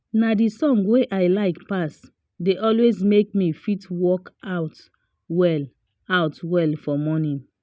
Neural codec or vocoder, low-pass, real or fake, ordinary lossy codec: none; none; real; none